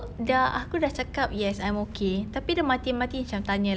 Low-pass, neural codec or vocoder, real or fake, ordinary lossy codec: none; none; real; none